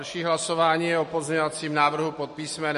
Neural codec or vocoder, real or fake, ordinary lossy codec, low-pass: none; real; MP3, 48 kbps; 14.4 kHz